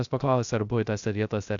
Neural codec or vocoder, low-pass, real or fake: codec, 16 kHz, 0.3 kbps, FocalCodec; 7.2 kHz; fake